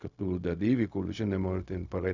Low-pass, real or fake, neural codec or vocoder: 7.2 kHz; fake; codec, 16 kHz, 0.4 kbps, LongCat-Audio-Codec